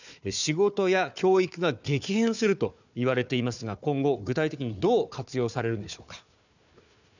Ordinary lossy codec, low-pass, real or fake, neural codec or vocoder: none; 7.2 kHz; fake; codec, 16 kHz, 4 kbps, FunCodec, trained on Chinese and English, 50 frames a second